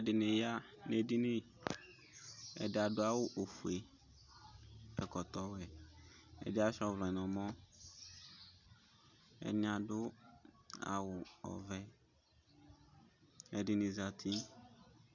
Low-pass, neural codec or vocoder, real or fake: 7.2 kHz; none; real